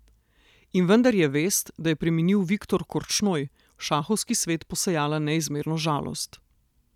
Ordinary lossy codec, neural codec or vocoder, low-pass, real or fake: none; none; 19.8 kHz; real